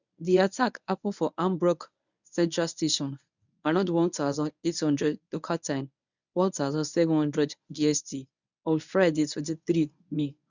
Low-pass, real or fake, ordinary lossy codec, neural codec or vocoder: 7.2 kHz; fake; none; codec, 24 kHz, 0.9 kbps, WavTokenizer, medium speech release version 1